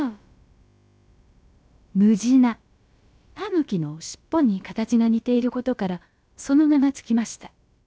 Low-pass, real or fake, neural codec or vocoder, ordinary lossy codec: none; fake; codec, 16 kHz, about 1 kbps, DyCAST, with the encoder's durations; none